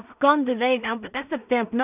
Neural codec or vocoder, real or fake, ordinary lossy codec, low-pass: codec, 16 kHz in and 24 kHz out, 0.4 kbps, LongCat-Audio-Codec, two codebook decoder; fake; none; 3.6 kHz